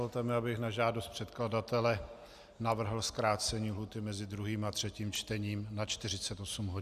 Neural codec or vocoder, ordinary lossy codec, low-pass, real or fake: none; Opus, 64 kbps; 14.4 kHz; real